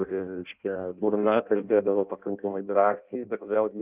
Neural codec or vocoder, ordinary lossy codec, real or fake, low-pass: codec, 16 kHz in and 24 kHz out, 0.6 kbps, FireRedTTS-2 codec; Opus, 32 kbps; fake; 3.6 kHz